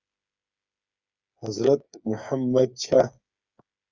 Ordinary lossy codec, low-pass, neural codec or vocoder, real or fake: Opus, 64 kbps; 7.2 kHz; codec, 16 kHz, 8 kbps, FreqCodec, smaller model; fake